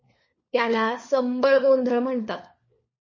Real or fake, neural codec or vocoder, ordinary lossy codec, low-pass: fake; codec, 16 kHz, 4 kbps, FunCodec, trained on LibriTTS, 50 frames a second; MP3, 32 kbps; 7.2 kHz